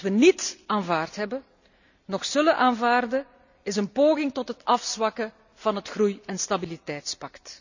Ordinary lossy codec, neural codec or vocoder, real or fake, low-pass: none; none; real; 7.2 kHz